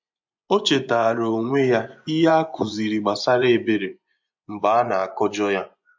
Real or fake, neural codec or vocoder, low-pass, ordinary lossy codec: fake; vocoder, 24 kHz, 100 mel bands, Vocos; 7.2 kHz; MP3, 48 kbps